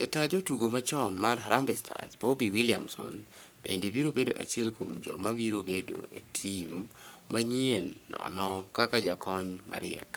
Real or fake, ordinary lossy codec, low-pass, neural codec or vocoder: fake; none; none; codec, 44.1 kHz, 3.4 kbps, Pupu-Codec